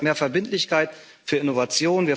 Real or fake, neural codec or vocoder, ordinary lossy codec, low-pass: real; none; none; none